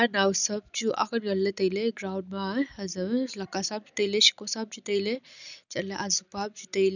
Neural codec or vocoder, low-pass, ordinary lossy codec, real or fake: none; 7.2 kHz; none; real